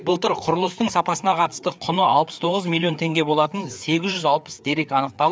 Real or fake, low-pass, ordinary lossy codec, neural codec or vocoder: fake; none; none; codec, 16 kHz, 4 kbps, FreqCodec, larger model